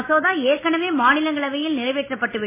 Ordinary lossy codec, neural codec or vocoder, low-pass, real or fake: MP3, 16 kbps; none; 3.6 kHz; real